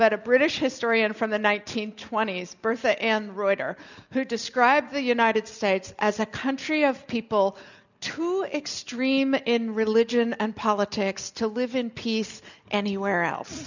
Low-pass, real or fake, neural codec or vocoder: 7.2 kHz; real; none